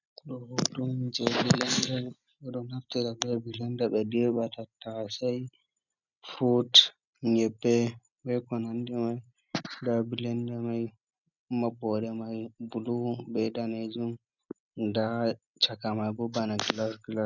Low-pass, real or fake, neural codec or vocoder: 7.2 kHz; real; none